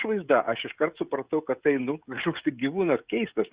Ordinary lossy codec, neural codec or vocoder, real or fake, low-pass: Opus, 64 kbps; codec, 16 kHz, 16 kbps, FreqCodec, smaller model; fake; 3.6 kHz